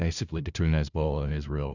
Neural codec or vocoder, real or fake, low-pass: codec, 16 kHz, 0.5 kbps, FunCodec, trained on LibriTTS, 25 frames a second; fake; 7.2 kHz